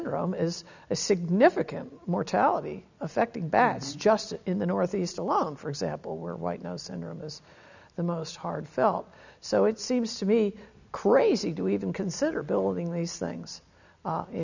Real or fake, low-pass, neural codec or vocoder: real; 7.2 kHz; none